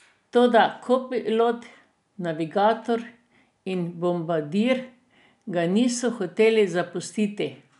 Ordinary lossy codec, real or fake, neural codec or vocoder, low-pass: none; real; none; 10.8 kHz